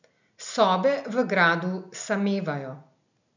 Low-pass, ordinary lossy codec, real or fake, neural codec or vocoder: 7.2 kHz; none; real; none